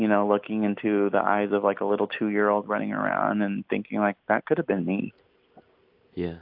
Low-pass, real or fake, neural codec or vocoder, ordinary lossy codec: 5.4 kHz; real; none; AAC, 48 kbps